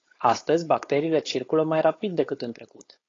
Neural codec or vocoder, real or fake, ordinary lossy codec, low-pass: codec, 16 kHz, 4.8 kbps, FACodec; fake; AAC, 32 kbps; 7.2 kHz